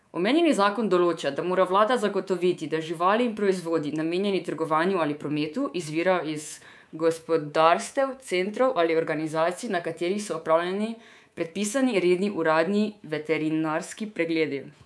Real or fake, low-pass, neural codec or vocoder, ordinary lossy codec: fake; none; codec, 24 kHz, 3.1 kbps, DualCodec; none